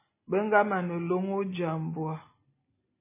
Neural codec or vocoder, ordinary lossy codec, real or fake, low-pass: none; MP3, 16 kbps; real; 3.6 kHz